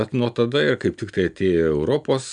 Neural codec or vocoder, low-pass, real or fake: none; 9.9 kHz; real